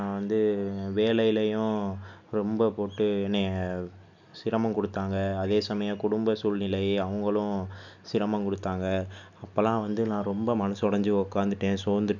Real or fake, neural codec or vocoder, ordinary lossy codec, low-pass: real; none; none; 7.2 kHz